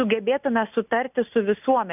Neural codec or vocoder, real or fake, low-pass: none; real; 3.6 kHz